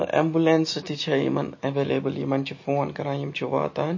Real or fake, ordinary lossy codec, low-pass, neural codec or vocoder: real; MP3, 32 kbps; 7.2 kHz; none